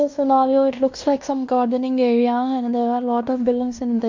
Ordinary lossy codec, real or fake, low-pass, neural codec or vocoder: MP3, 64 kbps; fake; 7.2 kHz; codec, 16 kHz in and 24 kHz out, 0.9 kbps, LongCat-Audio-Codec, fine tuned four codebook decoder